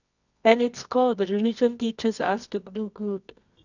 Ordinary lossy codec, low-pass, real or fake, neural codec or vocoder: none; 7.2 kHz; fake; codec, 24 kHz, 0.9 kbps, WavTokenizer, medium music audio release